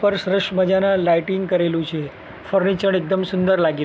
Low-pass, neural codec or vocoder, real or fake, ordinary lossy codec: none; none; real; none